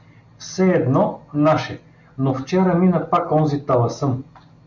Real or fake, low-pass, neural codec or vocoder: real; 7.2 kHz; none